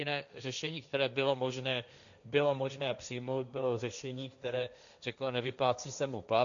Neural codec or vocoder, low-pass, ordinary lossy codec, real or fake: codec, 16 kHz, 1.1 kbps, Voila-Tokenizer; 7.2 kHz; MP3, 96 kbps; fake